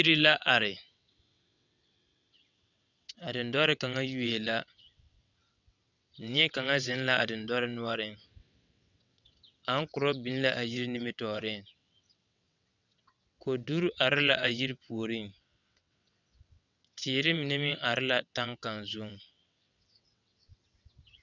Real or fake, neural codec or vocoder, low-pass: fake; vocoder, 22.05 kHz, 80 mel bands, WaveNeXt; 7.2 kHz